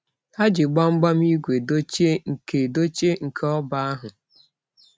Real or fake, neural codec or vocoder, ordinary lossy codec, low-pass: real; none; none; none